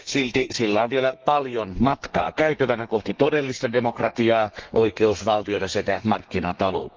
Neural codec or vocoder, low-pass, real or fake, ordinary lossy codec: codec, 32 kHz, 1.9 kbps, SNAC; 7.2 kHz; fake; Opus, 24 kbps